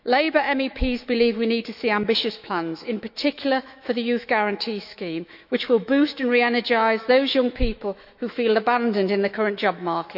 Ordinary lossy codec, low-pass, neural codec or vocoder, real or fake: none; 5.4 kHz; autoencoder, 48 kHz, 128 numbers a frame, DAC-VAE, trained on Japanese speech; fake